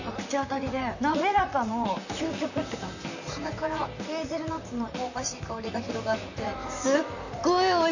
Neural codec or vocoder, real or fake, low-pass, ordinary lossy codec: vocoder, 44.1 kHz, 128 mel bands, Pupu-Vocoder; fake; 7.2 kHz; none